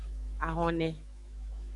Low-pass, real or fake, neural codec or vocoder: 10.8 kHz; fake; autoencoder, 48 kHz, 128 numbers a frame, DAC-VAE, trained on Japanese speech